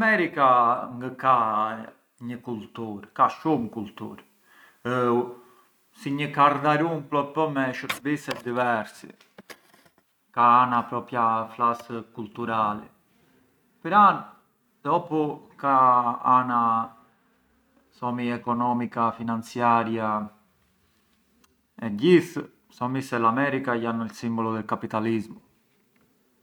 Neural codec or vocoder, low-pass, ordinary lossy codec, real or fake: none; 19.8 kHz; none; real